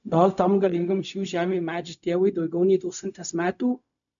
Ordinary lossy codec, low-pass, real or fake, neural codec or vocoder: MP3, 96 kbps; 7.2 kHz; fake; codec, 16 kHz, 0.4 kbps, LongCat-Audio-Codec